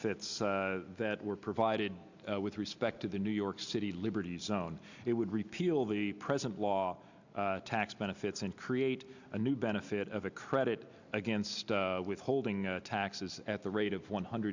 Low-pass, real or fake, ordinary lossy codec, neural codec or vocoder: 7.2 kHz; real; Opus, 64 kbps; none